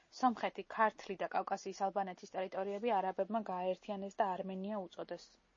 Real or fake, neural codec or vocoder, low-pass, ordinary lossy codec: real; none; 7.2 kHz; MP3, 32 kbps